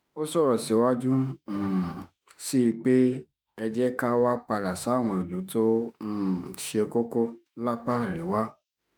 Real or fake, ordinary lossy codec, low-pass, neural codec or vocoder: fake; none; none; autoencoder, 48 kHz, 32 numbers a frame, DAC-VAE, trained on Japanese speech